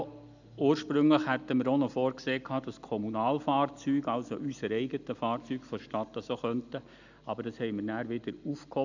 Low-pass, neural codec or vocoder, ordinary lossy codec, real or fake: 7.2 kHz; none; none; real